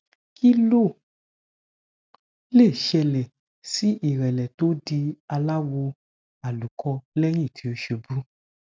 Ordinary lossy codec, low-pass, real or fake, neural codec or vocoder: none; none; real; none